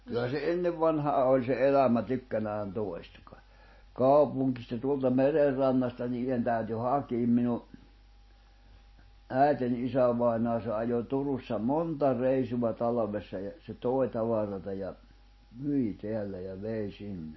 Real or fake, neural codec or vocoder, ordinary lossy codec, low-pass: real; none; MP3, 24 kbps; 7.2 kHz